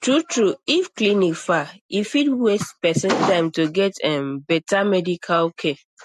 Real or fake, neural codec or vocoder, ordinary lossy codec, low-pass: real; none; MP3, 64 kbps; 14.4 kHz